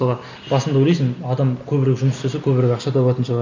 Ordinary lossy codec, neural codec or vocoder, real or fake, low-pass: MP3, 64 kbps; none; real; 7.2 kHz